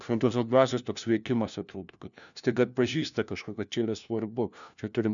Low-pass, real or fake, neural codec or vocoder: 7.2 kHz; fake; codec, 16 kHz, 1 kbps, FunCodec, trained on LibriTTS, 50 frames a second